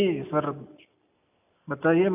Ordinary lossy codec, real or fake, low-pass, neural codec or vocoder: AAC, 32 kbps; real; 3.6 kHz; none